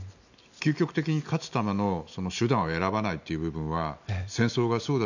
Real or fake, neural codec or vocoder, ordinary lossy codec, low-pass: real; none; none; 7.2 kHz